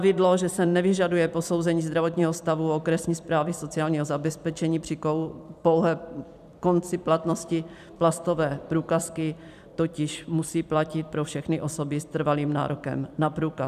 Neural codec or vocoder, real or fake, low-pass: vocoder, 44.1 kHz, 128 mel bands every 256 samples, BigVGAN v2; fake; 14.4 kHz